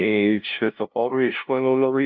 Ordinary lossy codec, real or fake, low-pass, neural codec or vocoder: Opus, 24 kbps; fake; 7.2 kHz; codec, 16 kHz, 0.5 kbps, FunCodec, trained on LibriTTS, 25 frames a second